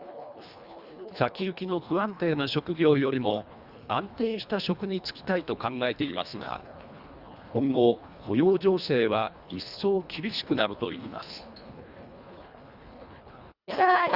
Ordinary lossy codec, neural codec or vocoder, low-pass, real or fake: Opus, 64 kbps; codec, 24 kHz, 1.5 kbps, HILCodec; 5.4 kHz; fake